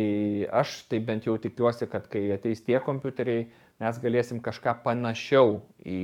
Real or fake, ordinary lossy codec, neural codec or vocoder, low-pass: fake; MP3, 96 kbps; codec, 44.1 kHz, 7.8 kbps, DAC; 19.8 kHz